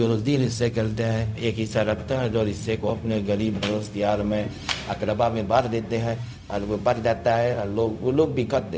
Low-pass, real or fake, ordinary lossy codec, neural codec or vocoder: none; fake; none; codec, 16 kHz, 0.4 kbps, LongCat-Audio-Codec